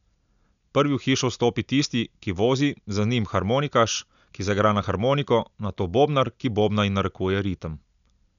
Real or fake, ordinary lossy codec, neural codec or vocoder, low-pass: real; none; none; 7.2 kHz